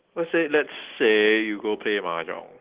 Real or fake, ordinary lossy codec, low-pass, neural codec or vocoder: real; Opus, 16 kbps; 3.6 kHz; none